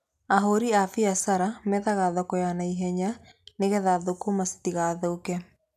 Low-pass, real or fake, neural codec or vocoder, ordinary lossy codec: 14.4 kHz; real; none; none